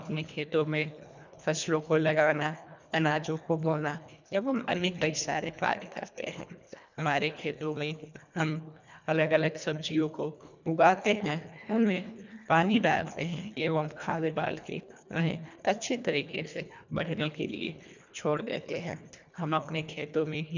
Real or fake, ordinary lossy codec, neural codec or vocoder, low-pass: fake; none; codec, 24 kHz, 1.5 kbps, HILCodec; 7.2 kHz